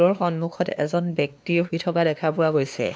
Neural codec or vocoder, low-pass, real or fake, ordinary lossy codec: codec, 16 kHz, 2 kbps, X-Codec, WavLM features, trained on Multilingual LibriSpeech; none; fake; none